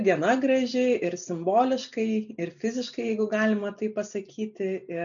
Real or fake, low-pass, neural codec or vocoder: real; 7.2 kHz; none